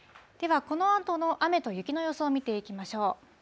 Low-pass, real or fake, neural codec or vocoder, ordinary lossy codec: none; real; none; none